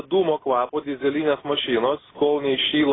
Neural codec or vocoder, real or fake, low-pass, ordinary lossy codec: none; real; 7.2 kHz; AAC, 16 kbps